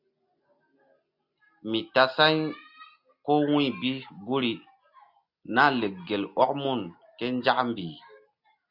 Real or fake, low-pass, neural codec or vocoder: real; 5.4 kHz; none